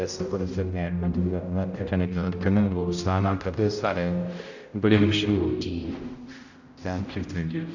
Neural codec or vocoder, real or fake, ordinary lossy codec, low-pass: codec, 16 kHz, 0.5 kbps, X-Codec, HuBERT features, trained on general audio; fake; none; 7.2 kHz